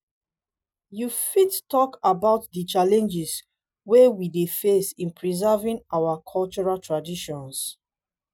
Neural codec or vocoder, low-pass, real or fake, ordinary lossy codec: none; none; real; none